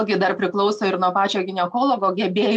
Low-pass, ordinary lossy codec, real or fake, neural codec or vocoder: 10.8 kHz; MP3, 64 kbps; real; none